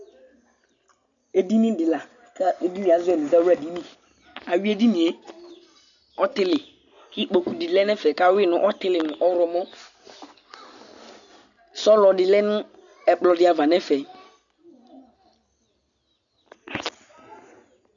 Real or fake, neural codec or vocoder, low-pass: real; none; 7.2 kHz